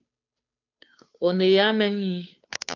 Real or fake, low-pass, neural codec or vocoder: fake; 7.2 kHz; codec, 16 kHz, 2 kbps, FunCodec, trained on Chinese and English, 25 frames a second